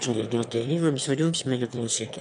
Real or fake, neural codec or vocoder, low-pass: fake; autoencoder, 22.05 kHz, a latent of 192 numbers a frame, VITS, trained on one speaker; 9.9 kHz